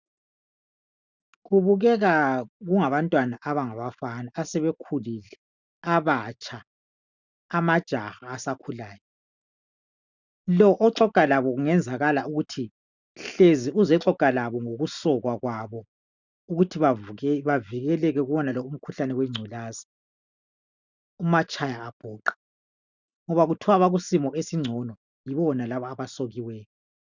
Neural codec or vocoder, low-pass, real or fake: none; 7.2 kHz; real